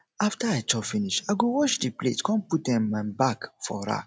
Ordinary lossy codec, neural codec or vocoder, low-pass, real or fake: none; none; none; real